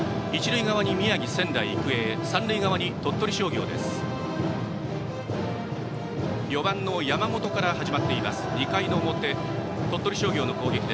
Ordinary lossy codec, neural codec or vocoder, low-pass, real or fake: none; none; none; real